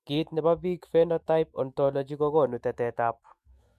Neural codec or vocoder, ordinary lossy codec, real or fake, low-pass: autoencoder, 48 kHz, 128 numbers a frame, DAC-VAE, trained on Japanese speech; MP3, 64 kbps; fake; 14.4 kHz